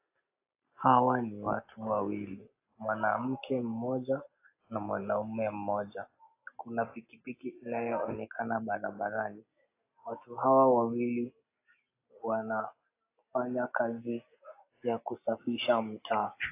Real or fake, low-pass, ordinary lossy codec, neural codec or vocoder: real; 3.6 kHz; AAC, 24 kbps; none